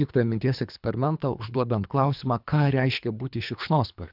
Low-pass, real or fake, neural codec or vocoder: 5.4 kHz; fake; codec, 24 kHz, 3 kbps, HILCodec